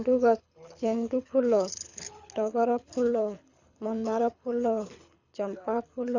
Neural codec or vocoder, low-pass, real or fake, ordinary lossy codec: codec, 24 kHz, 6 kbps, HILCodec; 7.2 kHz; fake; none